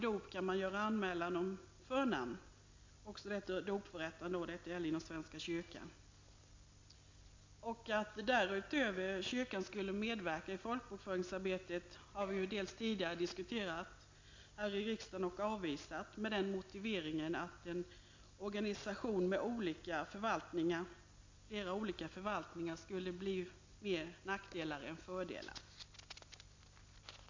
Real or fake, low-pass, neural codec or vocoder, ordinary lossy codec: real; 7.2 kHz; none; MP3, 48 kbps